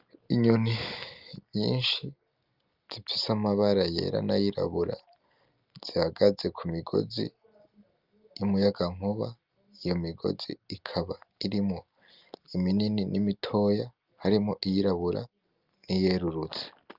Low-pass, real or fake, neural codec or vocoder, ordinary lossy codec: 5.4 kHz; real; none; Opus, 24 kbps